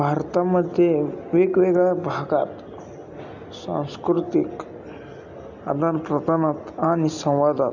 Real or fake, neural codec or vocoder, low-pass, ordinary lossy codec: real; none; 7.2 kHz; none